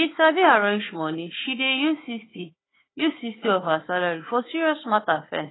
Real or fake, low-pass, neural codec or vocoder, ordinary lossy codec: fake; 7.2 kHz; codec, 16 kHz, 4 kbps, FunCodec, trained on Chinese and English, 50 frames a second; AAC, 16 kbps